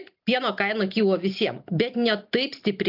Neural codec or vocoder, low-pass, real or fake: none; 5.4 kHz; real